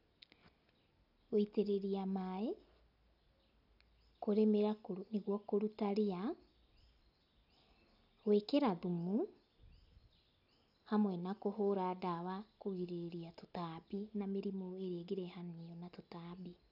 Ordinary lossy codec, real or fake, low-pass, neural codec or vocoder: none; real; 5.4 kHz; none